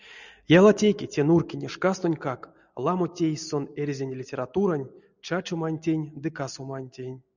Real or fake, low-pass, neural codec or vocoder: real; 7.2 kHz; none